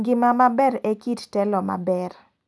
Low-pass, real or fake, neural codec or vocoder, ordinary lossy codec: none; real; none; none